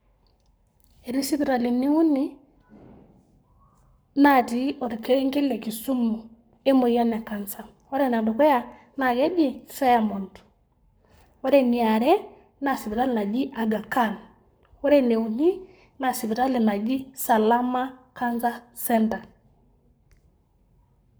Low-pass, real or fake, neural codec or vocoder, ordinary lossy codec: none; fake; codec, 44.1 kHz, 7.8 kbps, Pupu-Codec; none